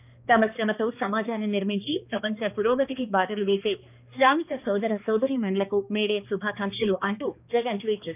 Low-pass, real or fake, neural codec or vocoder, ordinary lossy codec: 3.6 kHz; fake; codec, 16 kHz, 2 kbps, X-Codec, HuBERT features, trained on balanced general audio; none